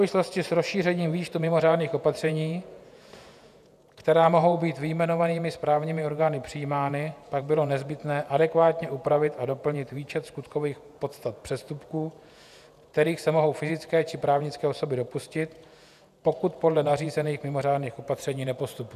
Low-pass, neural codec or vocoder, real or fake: 14.4 kHz; vocoder, 48 kHz, 128 mel bands, Vocos; fake